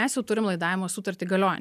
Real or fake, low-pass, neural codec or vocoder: real; 14.4 kHz; none